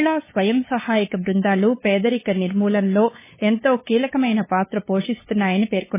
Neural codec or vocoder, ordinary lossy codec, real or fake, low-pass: codec, 16 kHz, 8 kbps, FunCodec, trained on LibriTTS, 25 frames a second; MP3, 16 kbps; fake; 3.6 kHz